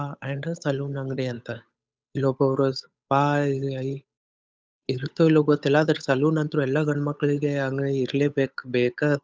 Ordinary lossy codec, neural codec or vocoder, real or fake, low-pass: none; codec, 16 kHz, 8 kbps, FunCodec, trained on Chinese and English, 25 frames a second; fake; none